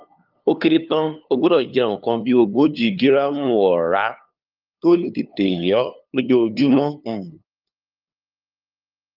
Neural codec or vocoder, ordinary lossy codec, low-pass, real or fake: codec, 16 kHz, 2 kbps, FunCodec, trained on LibriTTS, 25 frames a second; Opus, 24 kbps; 5.4 kHz; fake